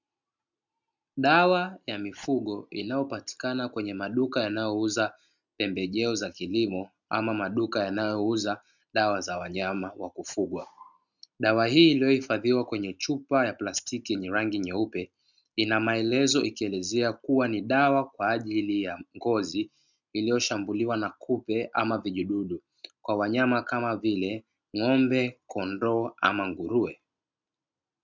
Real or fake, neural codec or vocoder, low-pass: real; none; 7.2 kHz